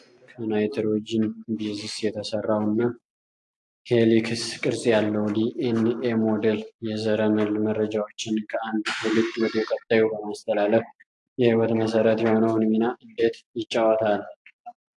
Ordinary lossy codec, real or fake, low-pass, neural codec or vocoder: AAC, 64 kbps; real; 10.8 kHz; none